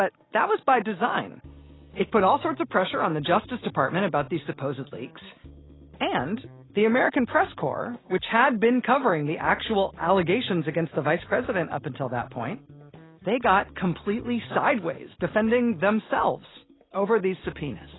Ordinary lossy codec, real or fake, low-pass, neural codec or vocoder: AAC, 16 kbps; real; 7.2 kHz; none